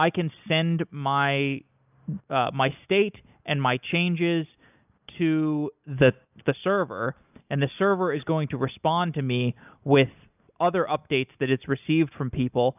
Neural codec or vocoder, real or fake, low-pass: none; real; 3.6 kHz